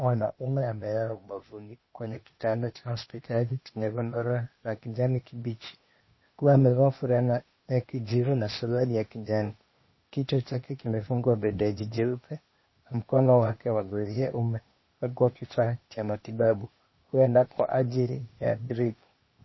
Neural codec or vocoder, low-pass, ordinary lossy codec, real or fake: codec, 16 kHz, 0.8 kbps, ZipCodec; 7.2 kHz; MP3, 24 kbps; fake